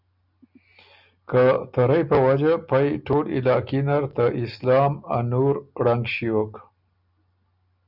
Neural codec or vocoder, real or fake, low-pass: none; real; 5.4 kHz